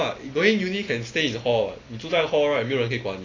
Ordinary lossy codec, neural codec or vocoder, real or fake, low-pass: AAC, 32 kbps; none; real; 7.2 kHz